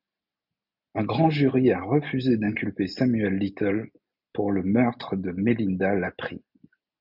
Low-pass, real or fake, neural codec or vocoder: 5.4 kHz; real; none